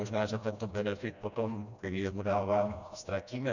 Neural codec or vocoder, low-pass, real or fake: codec, 16 kHz, 1 kbps, FreqCodec, smaller model; 7.2 kHz; fake